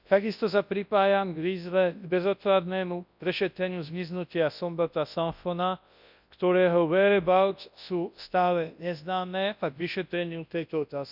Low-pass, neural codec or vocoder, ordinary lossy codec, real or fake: 5.4 kHz; codec, 24 kHz, 0.9 kbps, WavTokenizer, large speech release; none; fake